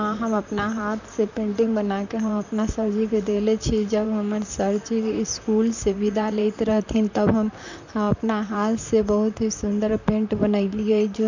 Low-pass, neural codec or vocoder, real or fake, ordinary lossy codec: 7.2 kHz; vocoder, 44.1 kHz, 128 mel bands, Pupu-Vocoder; fake; none